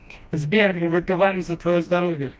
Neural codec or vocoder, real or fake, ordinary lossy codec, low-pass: codec, 16 kHz, 1 kbps, FreqCodec, smaller model; fake; none; none